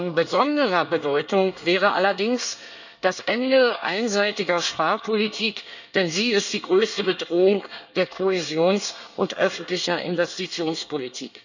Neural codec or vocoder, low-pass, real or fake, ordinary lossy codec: codec, 24 kHz, 1 kbps, SNAC; 7.2 kHz; fake; none